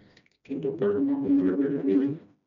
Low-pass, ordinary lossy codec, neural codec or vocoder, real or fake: 7.2 kHz; none; codec, 16 kHz, 1 kbps, FreqCodec, smaller model; fake